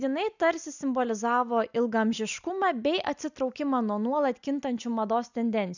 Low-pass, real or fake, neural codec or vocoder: 7.2 kHz; real; none